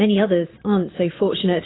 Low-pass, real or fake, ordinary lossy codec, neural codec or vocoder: 7.2 kHz; fake; AAC, 16 kbps; vocoder, 22.05 kHz, 80 mel bands, Vocos